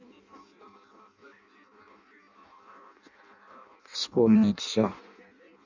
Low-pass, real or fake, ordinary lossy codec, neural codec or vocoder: 7.2 kHz; fake; Opus, 64 kbps; codec, 16 kHz in and 24 kHz out, 0.6 kbps, FireRedTTS-2 codec